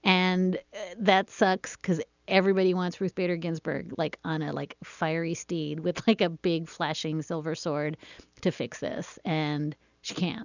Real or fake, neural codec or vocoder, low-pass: real; none; 7.2 kHz